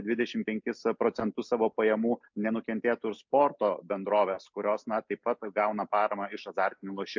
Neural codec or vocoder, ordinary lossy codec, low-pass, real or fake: none; MP3, 64 kbps; 7.2 kHz; real